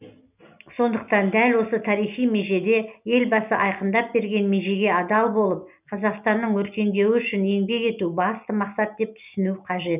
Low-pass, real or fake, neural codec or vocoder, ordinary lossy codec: 3.6 kHz; real; none; none